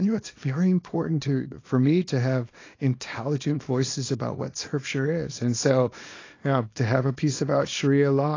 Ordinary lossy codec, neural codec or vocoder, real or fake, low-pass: AAC, 32 kbps; codec, 24 kHz, 0.9 kbps, WavTokenizer, small release; fake; 7.2 kHz